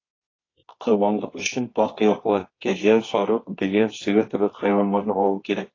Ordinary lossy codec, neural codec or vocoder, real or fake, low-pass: AAC, 32 kbps; codec, 24 kHz, 0.9 kbps, WavTokenizer, medium music audio release; fake; 7.2 kHz